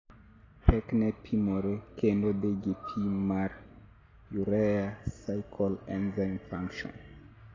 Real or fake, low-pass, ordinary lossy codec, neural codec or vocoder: real; 7.2 kHz; AAC, 32 kbps; none